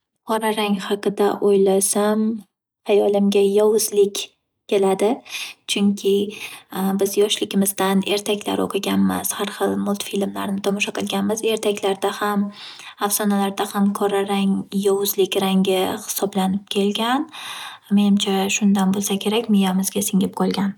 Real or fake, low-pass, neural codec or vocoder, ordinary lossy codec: real; none; none; none